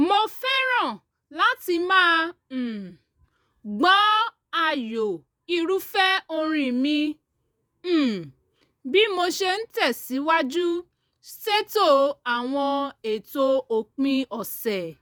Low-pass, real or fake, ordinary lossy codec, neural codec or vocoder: none; fake; none; vocoder, 48 kHz, 128 mel bands, Vocos